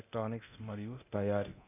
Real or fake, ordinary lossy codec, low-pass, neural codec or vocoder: fake; none; 3.6 kHz; codec, 24 kHz, 0.9 kbps, DualCodec